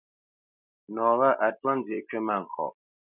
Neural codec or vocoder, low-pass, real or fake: none; 3.6 kHz; real